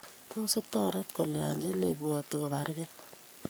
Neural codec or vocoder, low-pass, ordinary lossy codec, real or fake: codec, 44.1 kHz, 3.4 kbps, Pupu-Codec; none; none; fake